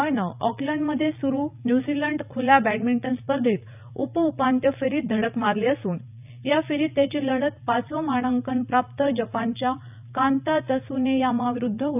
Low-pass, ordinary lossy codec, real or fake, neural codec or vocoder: 3.6 kHz; none; fake; vocoder, 44.1 kHz, 80 mel bands, Vocos